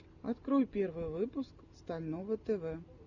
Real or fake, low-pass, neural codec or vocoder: real; 7.2 kHz; none